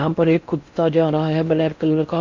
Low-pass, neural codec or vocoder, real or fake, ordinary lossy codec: 7.2 kHz; codec, 16 kHz in and 24 kHz out, 0.6 kbps, FocalCodec, streaming, 4096 codes; fake; Opus, 64 kbps